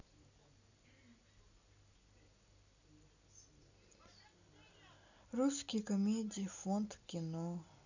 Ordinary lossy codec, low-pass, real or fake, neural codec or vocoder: none; 7.2 kHz; real; none